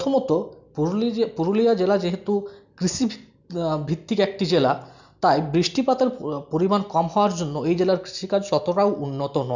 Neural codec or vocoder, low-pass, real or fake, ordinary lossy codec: none; 7.2 kHz; real; none